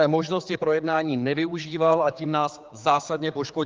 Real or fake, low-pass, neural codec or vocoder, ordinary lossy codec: fake; 7.2 kHz; codec, 16 kHz, 4 kbps, X-Codec, HuBERT features, trained on general audio; Opus, 32 kbps